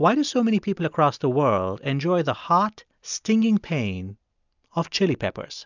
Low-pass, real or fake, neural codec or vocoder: 7.2 kHz; real; none